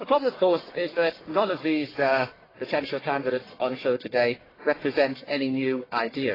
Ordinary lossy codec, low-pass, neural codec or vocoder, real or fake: AAC, 24 kbps; 5.4 kHz; codec, 44.1 kHz, 1.7 kbps, Pupu-Codec; fake